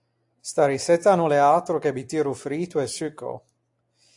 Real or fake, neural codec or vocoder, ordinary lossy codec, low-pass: real; none; AAC, 64 kbps; 10.8 kHz